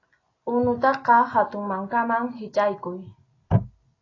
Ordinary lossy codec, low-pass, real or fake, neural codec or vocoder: AAC, 32 kbps; 7.2 kHz; real; none